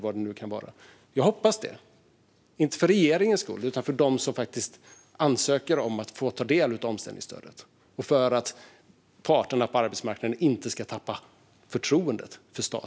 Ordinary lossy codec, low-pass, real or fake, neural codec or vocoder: none; none; real; none